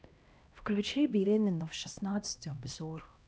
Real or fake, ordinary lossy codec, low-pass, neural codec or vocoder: fake; none; none; codec, 16 kHz, 1 kbps, X-Codec, HuBERT features, trained on LibriSpeech